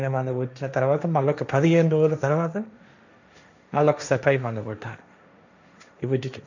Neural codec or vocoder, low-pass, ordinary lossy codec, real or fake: codec, 16 kHz, 1.1 kbps, Voila-Tokenizer; 7.2 kHz; none; fake